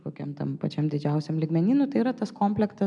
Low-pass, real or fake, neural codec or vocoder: 10.8 kHz; real; none